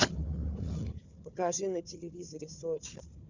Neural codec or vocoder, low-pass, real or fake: codec, 16 kHz, 4 kbps, FunCodec, trained on Chinese and English, 50 frames a second; 7.2 kHz; fake